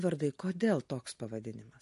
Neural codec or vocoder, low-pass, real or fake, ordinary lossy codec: none; 14.4 kHz; real; MP3, 48 kbps